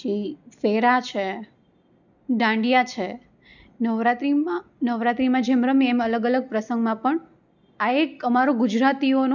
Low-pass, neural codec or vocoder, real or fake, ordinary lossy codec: 7.2 kHz; none; real; none